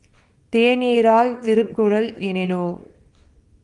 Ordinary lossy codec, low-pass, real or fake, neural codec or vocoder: Opus, 32 kbps; 10.8 kHz; fake; codec, 24 kHz, 0.9 kbps, WavTokenizer, small release